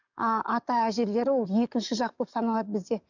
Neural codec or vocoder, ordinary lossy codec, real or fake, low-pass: codec, 44.1 kHz, 7.8 kbps, DAC; none; fake; 7.2 kHz